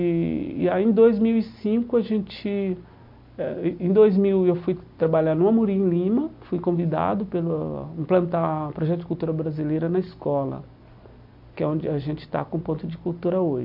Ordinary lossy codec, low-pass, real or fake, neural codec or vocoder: MP3, 48 kbps; 5.4 kHz; real; none